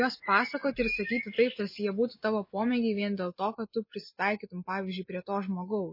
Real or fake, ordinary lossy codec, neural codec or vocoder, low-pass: real; MP3, 24 kbps; none; 5.4 kHz